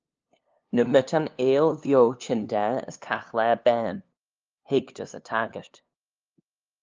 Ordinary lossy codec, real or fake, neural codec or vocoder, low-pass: Opus, 24 kbps; fake; codec, 16 kHz, 2 kbps, FunCodec, trained on LibriTTS, 25 frames a second; 7.2 kHz